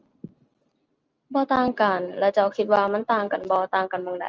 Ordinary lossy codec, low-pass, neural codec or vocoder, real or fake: Opus, 24 kbps; 7.2 kHz; none; real